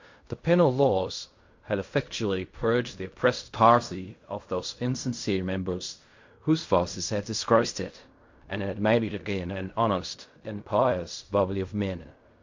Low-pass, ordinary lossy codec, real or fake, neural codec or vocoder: 7.2 kHz; MP3, 48 kbps; fake; codec, 16 kHz in and 24 kHz out, 0.4 kbps, LongCat-Audio-Codec, fine tuned four codebook decoder